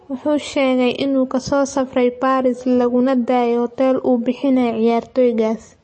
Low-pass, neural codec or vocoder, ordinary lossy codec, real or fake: 9.9 kHz; none; MP3, 32 kbps; real